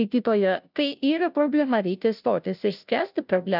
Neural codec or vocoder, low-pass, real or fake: codec, 16 kHz, 0.5 kbps, FunCodec, trained on Chinese and English, 25 frames a second; 5.4 kHz; fake